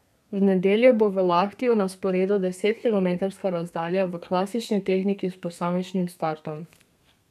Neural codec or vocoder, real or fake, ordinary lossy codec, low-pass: codec, 32 kHz, 1.9 kbps, SNAC; fake; none; 14.4 kHz